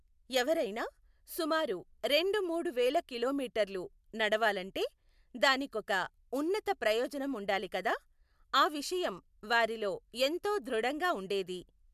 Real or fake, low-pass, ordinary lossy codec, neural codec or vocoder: real; 14.4 kHz; MP3, 96 kbps; none